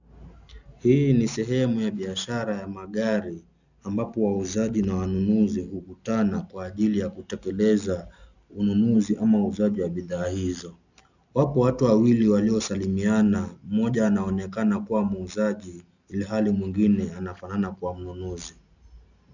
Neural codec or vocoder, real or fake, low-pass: none; real; 7.2 kHz